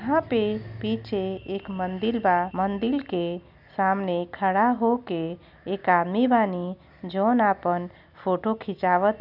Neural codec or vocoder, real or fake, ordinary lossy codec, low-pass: none; real; none; 5.4 kHz